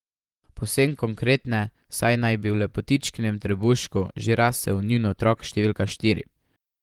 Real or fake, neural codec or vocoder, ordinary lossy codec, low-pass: fake; vocoder, 44.1 kHz, 128 mel bands, Pupu-Vocoder; Opus, 24 kbps; 19.8 kHz